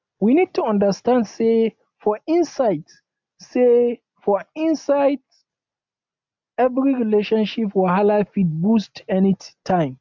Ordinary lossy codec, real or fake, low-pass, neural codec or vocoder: MP3, 64 kbps; real; 7.2 kHz; none